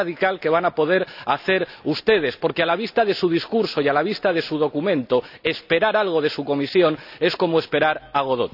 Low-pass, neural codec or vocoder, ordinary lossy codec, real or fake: 5.4 kHz; none; none; real